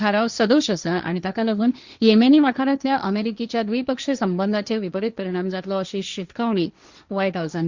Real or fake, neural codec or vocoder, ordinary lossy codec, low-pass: fake; codec, 16 kHz, 1.1 kbps, Voila-Tokenizer; Opus, 64 kbps; 7.2 kHz